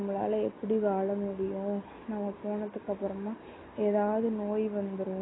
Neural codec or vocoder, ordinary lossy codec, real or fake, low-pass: none; AAC, 16 kbps; real; 7.2 kHz